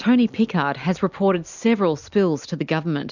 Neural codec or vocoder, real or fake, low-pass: none; real; 7.2 kHz